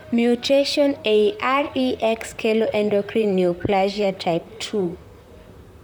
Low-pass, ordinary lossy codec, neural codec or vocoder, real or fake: none; none; vocoder, 44.1 kHz, 128 mel bands, Pupu-Vocoder; fake